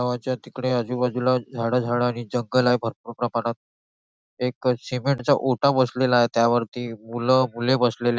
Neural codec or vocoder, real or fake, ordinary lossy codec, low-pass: none; real; none; none